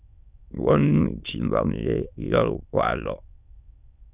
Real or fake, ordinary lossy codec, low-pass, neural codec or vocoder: fake; Opus, 64 kbps; 3.6 kHz; autoencoder, 22.05 kHz, a latent of 192 numbers a frame, VITS, trained on many speakers